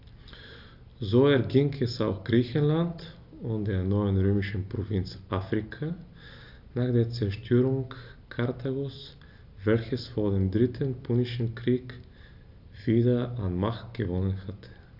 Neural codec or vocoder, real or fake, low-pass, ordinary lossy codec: none; real; 5.4 kHz; AAC, 48 kbps